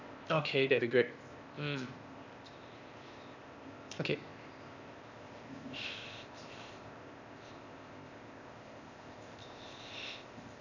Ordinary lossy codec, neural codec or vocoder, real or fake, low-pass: none; codec, 16 kHz, 0.8 kbps, ZipCodec; fake; 7.2 kHz